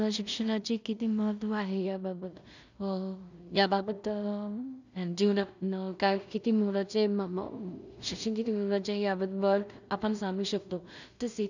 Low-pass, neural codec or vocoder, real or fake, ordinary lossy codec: 7.2 kHz; codec, 16 kHz in and 24 kHz out, 0.4 kbps, LongCat-Audio-Codec, two codebook decoder; fake; none